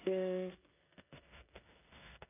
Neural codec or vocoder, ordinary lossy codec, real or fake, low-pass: codec, 16 kHz in and 24 kHz out, 0.9 kbps, LongCat-Audio-Codec, four codebook decoder; none; fake; 3.6 kHz